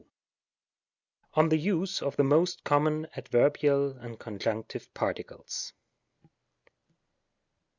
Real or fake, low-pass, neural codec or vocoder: real; 7.2 kHz; none